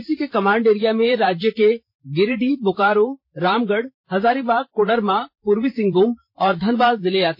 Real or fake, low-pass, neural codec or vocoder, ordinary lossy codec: fake; 5.4 kHz; vocoder, 44.1 kHz, 128 mel bands every 512 samples, BigVGAN v2; MP3, 32 kbps